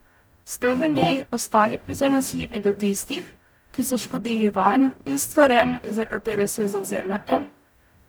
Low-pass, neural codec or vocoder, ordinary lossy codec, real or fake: none; codec, 44.1 kHz, 0.9 kbps, DAC; none; fake